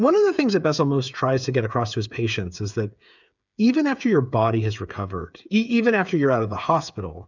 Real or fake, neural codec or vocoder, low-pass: fake; codec, 16 kHz, 8 kbps, FreqCodec, smaller model; 7.2 kHz